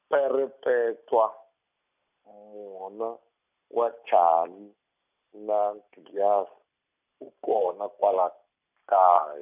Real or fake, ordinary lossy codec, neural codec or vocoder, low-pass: real; none; none; 3.6 kHz